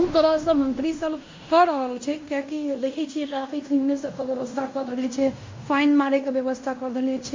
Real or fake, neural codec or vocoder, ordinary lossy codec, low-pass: fake; codec, 16 kHz in and 24 kHz out, 0.9 kbps, LongCat-Audio-Codec, fine tuned four codebook decoder; MP3, 48 kbps; 7.2 kHz